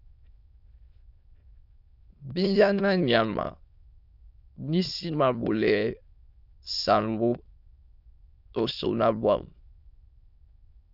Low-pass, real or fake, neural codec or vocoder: 5.4 kHz; fake; autoencoder, 22.05 kHz, a latent of 192 numbers a frame, VITS, trained on many speakers